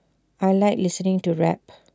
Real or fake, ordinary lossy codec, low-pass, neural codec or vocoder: real; none; none; none